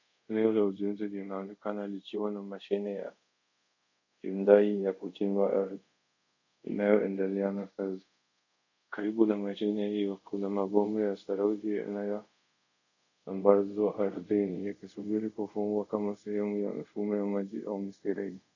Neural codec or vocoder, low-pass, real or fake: codec, 24 kHz, 0.5 kbps, DualCodec; 7.2 kHz; fake